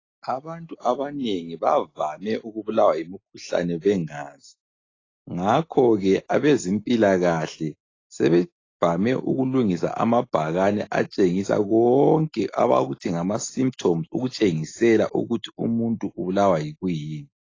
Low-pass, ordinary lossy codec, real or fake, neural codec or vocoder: 7.2 kHz; AAC, 32 kbps; real; none